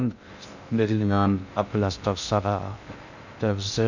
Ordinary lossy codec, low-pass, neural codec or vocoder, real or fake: none; 7.2 kHz; codec, 16 kHz in and 24 kHz out, 0.6 kbps, FocalCodec, streaming, 2048 codes; fake